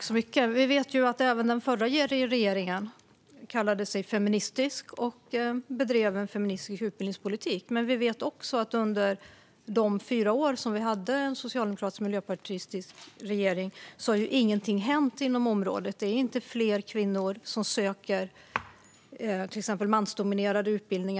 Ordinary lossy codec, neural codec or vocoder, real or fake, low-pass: none; none; real; none